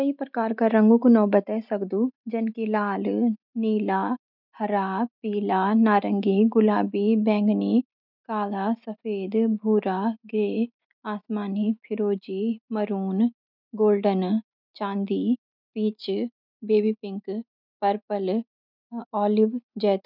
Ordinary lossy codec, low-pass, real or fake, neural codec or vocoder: none; 5.4 kHz; real; none